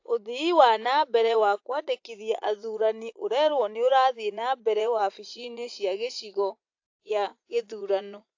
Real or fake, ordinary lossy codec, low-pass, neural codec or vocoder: fake; AAC, 48 kbps; 7.2 kHz; vocoder, 44.1 kHz, 80 mel bands, Vocos